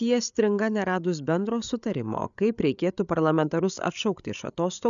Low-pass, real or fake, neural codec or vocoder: 7.2 kHz; fake; codec, 16 kHz, 8 kbps, FreqCodec, larger model